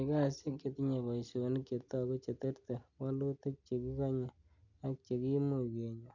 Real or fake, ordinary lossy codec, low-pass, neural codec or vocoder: real; none; 7.2 kHz; none